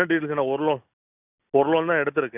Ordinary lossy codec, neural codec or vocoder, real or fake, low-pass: none; none; real; 3.6 kHz